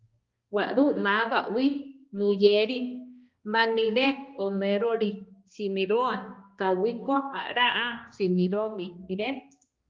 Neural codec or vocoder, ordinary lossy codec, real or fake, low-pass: codec, 16 kHz, 1 kbps, X-Codec, HuBERT features, trained on balanced general audio; Opus, 32 kbps; fake; 7.2 kHz